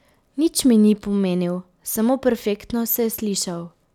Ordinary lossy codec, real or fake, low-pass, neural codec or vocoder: none; real; 19.8 kHz; none